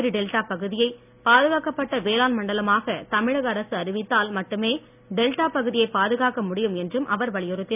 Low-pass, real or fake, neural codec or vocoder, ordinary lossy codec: 3.6 kHz; real; none; none